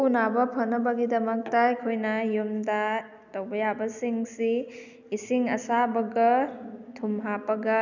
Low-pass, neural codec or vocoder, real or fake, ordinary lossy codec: 7.2 kHz; none; real; none